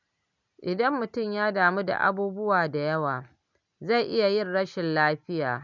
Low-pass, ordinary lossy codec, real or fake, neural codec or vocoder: 7.2 kHz; none; real; none